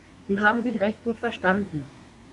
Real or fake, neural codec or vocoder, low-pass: fake; codec, 24 kHz, 1 kbps, SNAC; 10.8 kHz